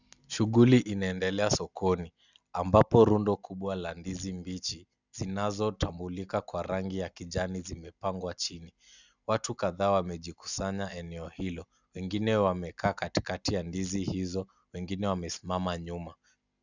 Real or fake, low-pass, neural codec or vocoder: real; 7.2 kHz; none